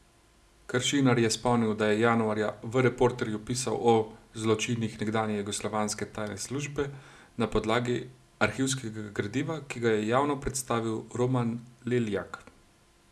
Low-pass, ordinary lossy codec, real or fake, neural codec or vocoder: none; none; real; none